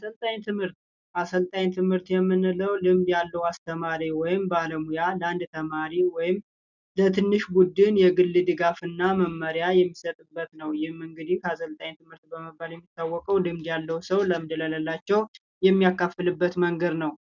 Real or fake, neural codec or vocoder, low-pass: real; none; 7.2 kHz